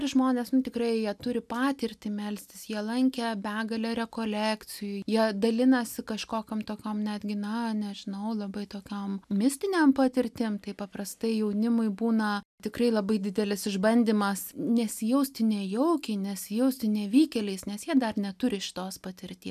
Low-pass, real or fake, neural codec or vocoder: 14.4 kHz; real; none